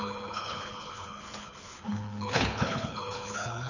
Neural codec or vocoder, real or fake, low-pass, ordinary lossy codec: codec, 24 kHz, 6 kbps, HILCodec; fake; 7.2 kHz; none